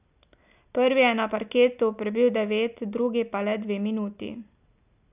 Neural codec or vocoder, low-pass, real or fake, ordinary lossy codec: none; 3.6 kHz; real; none